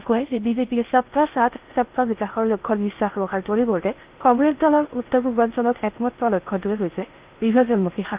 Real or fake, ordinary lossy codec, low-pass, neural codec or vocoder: fake; Opus, 32 kbps; 3.6 kHz; codec, 16 kHz in and 24 kHz out, 0.6 kbps, FocalCodec, streaming, 2048 codes